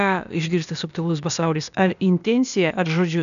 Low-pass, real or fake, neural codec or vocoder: 7.2 kHz; fake; codec, 16 kHz, 0.8 kbps, ZipCodec